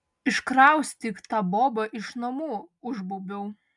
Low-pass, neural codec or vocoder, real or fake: 10.8 kHz; none; real